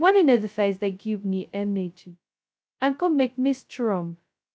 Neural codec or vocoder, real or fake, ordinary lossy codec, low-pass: codec, 16 kHz, 0.2 kbps, FocalCodec; fake; none; none